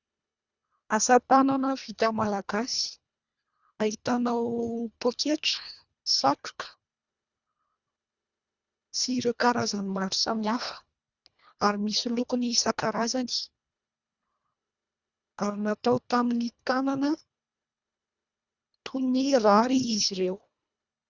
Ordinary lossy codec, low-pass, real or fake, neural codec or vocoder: Opus, 64 kbps; 7.2 kHz; fake; codec, 24 kHz, 1.5 kbps, HILCodec